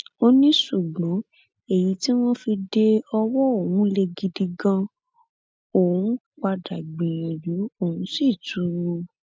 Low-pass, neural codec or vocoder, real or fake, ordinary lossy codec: none; none; real; none